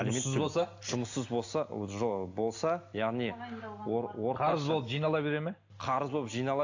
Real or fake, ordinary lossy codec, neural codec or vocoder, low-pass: real; AAC, 48 kbps; none; 7.2 kHz